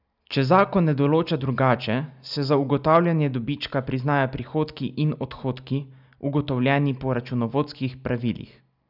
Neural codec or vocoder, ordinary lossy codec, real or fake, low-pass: none; none; real; 5.4 kHz